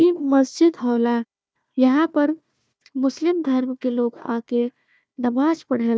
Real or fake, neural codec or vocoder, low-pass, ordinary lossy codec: fake; codec, 16 kHz, 1 kbps, FunCodec, trained on Chinese and English, 50 frames a second; none; none